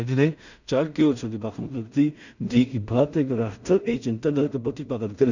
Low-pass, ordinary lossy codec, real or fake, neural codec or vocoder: 7.2 kHz; none; fake; codec, 16 kHz in and 24 kHz out, 0.4 kbps, LongCat-Audio-Codec, two codebook decoder